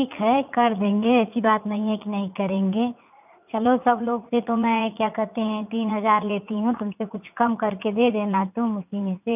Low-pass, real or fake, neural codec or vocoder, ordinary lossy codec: 3.6 kHz; fake; vocoder, 44.1 kHz, 80 mel bands, Vocos; none